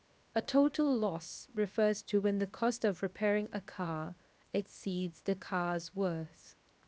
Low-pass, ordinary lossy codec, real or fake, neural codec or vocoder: none; none; fake; codec, 16 kHz, 0.7 kbps, FocalCodec